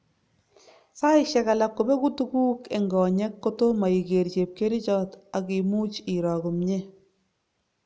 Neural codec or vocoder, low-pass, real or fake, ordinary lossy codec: none; none; real; none